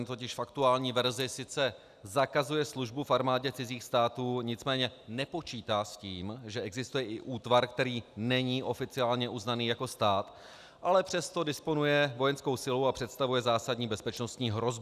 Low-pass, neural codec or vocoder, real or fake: 14.4 kHz; none; real